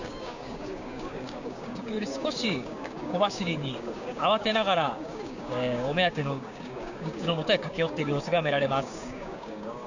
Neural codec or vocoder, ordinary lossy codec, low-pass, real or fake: codec, 16 kHz, 6 kbps, DAC; none; 7.2 kHz; fake